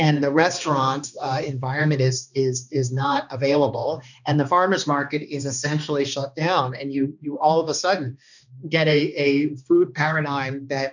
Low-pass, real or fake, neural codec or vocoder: 7.2 kHz; fake; codec, 16 kHz, 2 kbps, X-Codec, HuBERT features, trained on balanced general audio